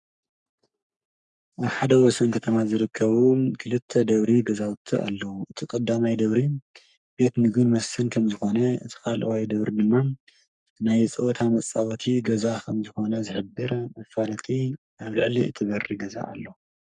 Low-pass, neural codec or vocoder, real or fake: 10.8 kHz; codec, 44.1 kHz, 3.4 kbps, Pupu-Codec; fake